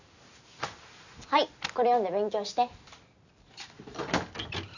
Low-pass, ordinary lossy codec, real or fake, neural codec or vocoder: 7.2 kHz; none; real; none